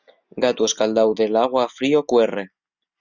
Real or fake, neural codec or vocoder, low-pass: real; none; 7.2 kHz